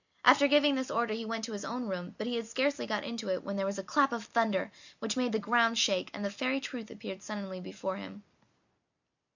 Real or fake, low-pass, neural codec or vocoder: real; 7.2 kHz; none